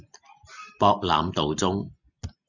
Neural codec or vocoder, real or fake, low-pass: none; real; 7.2 kHz